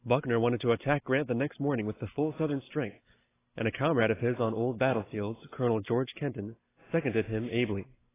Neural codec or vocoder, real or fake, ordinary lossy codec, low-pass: none; real; AAC, 16 kbps; 3.6 kHz